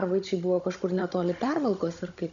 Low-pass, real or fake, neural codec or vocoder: 7.2 kHz; fake; codec, 16 kHz, 16 kbps, FunCodec, trained on Chinese and English, 50 frames a second